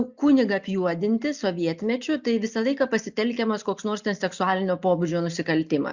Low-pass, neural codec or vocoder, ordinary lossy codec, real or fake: 7.2 kHz; none; Opus, 64 kbps; real